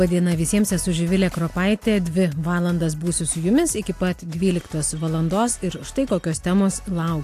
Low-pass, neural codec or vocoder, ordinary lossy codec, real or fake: 14.4 kHz; none; AAC, 64 kbps; real